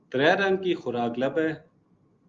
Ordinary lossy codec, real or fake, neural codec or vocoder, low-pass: Opus, 32 kbps; real; none; 7.2 kHz